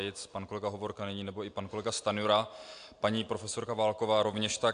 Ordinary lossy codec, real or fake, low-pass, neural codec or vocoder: AAC, 64 kbps; real; 9.9 kHz; none